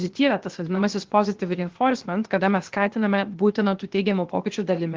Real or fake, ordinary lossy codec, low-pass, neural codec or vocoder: fake; Opus, 16 kbps; 7.2 kHz; codec, 16 kHz, 0.8 kbps, ZipCodec